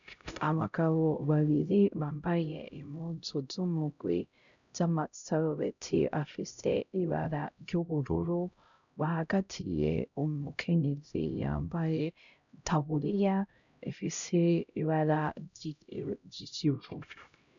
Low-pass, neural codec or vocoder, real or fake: 7.2 kHz; codec, 16 kHz, 0.5 kbps, X-Codec, HuBERT features, trained on LibriSpeech; fake